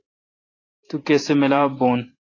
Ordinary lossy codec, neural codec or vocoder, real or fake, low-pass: AAC, 32 kbps; vocoder, 44.1 kHz, 128 mel bands every 512 samples, BigVGAN v2; fake; 7.2 kHz